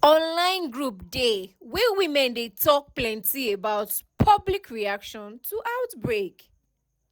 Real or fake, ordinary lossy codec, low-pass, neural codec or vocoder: real; none; none; none